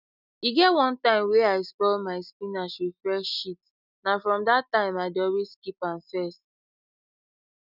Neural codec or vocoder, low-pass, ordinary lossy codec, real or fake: none; 5.4 kHz; none; real